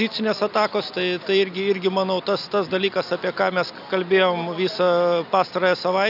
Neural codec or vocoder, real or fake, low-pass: none; real; 5.4 kHz